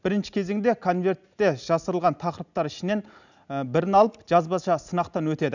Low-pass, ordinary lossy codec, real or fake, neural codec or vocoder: 7.2 kHz; none; real; none